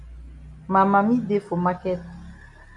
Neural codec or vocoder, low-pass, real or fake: none; 10.8 kHz; real